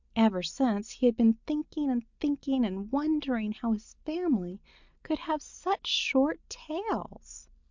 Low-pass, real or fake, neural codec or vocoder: 7.2 kHz; real; none